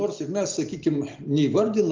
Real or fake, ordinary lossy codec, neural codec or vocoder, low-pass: real; Opus, 32 kbps; none; 7.2 kHz